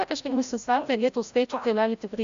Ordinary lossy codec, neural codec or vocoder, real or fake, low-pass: Opus, 64 kbps; codec, 16 kHz, 0.5 kbps, FreqCodec, larger model; fake; 7.2 kHz